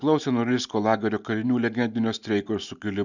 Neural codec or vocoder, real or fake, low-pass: none; real; 7.2 kHz